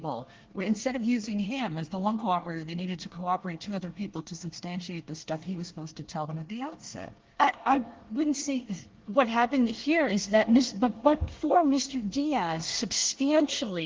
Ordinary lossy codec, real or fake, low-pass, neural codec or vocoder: Opus, 16 kbps; fake; 7.2 kHz; codec, 24 kHz, 1 kbps, SNAC